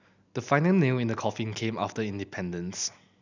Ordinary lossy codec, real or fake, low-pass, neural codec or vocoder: none; real; 7.2 kHz; none